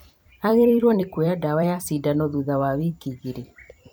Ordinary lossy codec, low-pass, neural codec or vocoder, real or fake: none; none; vocoder, 44.1 kHz, 128 mel bands every 256 samples, BigVGAN v2; fake